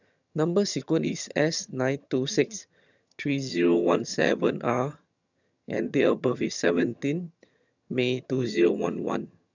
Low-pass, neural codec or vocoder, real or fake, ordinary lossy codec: 7.2 kHz; vocoder, 22.05 kHz, 80 mel bands, HiFi-GAN; fake; none